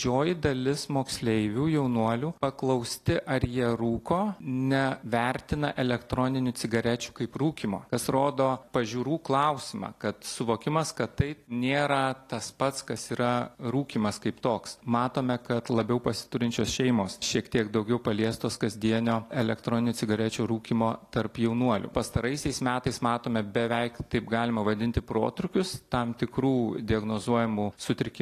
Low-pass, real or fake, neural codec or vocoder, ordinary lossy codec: 14.4 kHz; real; none; AAC, 48 kbps